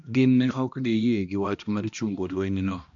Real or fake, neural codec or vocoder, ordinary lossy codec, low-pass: fake; codec, 16 kHz, 1 kbps, X-Codec, HuBERT features, trained on balanced general audio; AAC, 64 kbps; 7.2 kHz